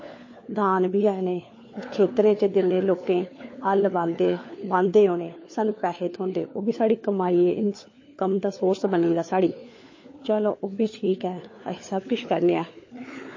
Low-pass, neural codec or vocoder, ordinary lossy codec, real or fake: 7.2 kHz; codec, 16 kHz, 4 kbps, FunCodec, trained on LibriTTS, 50 frames a second; MP3, 32 kbps; fake